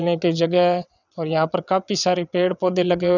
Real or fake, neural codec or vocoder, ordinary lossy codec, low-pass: fake; vocoder, 22.05 kHz, 80 mel bands, WaveNeXt; none; 7.2 kHz